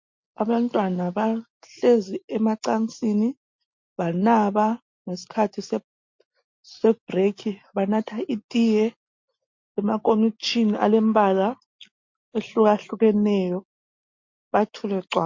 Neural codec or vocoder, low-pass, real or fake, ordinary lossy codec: none; 7.2 kHz; real; MP3, 48 kbps